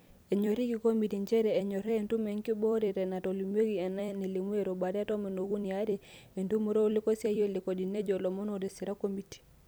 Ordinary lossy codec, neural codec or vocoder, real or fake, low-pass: none; vocoder, 44.1 kHz, 128 mel bands every 256 samples, BigVGAN v2; fake; none